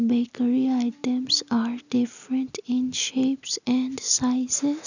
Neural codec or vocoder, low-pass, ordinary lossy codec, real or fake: none; 7.2 kHz; none; real